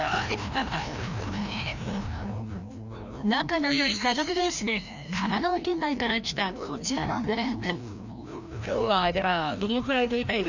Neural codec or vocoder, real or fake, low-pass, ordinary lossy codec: codec, 16 kHz, 1 kbps, FreqCodec, larger model; fake; 7.2 kHz; none